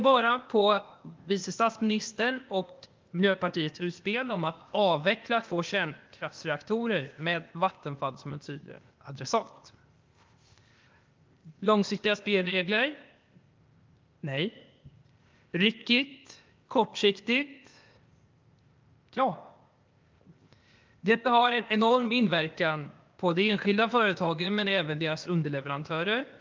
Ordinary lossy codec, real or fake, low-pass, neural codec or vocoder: Opus, 24 kbps; fake; 7.2 kHz; codec, 16 kHz, 0.8 kbps, ZipCodec